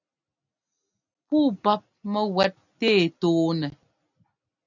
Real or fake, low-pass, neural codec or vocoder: real; 7.2 kHz; none